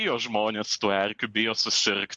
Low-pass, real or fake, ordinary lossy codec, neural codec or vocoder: 10.8 kHz; real; MP3, 64 kbps; none